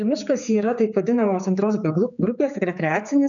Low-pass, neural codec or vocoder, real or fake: 7.2 kHz; codec, 16 kHz, 4 kbps, X-Codec, HuBERT features, trained on general audio; fake